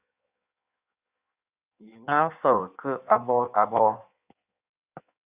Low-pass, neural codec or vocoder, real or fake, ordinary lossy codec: 3.6 kHz; codec, 16 kHz in and 24 kHz out, 1.1 kbps, FireRedTTS-2 codec; fake; Opus, 64 kbps